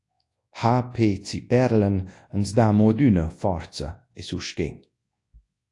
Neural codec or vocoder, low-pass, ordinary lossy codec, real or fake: codec, 24 kHz, 0.9 kbps, WavTokenizer, large speech release; 10.8 kHz; AAC, 48 kbps; fake